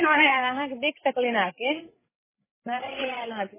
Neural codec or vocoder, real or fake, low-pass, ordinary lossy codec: codec, 16 kHz, 16 kbps, FreqCodec, larger model; fake; 3.6 kHz; MP3, 16 kbps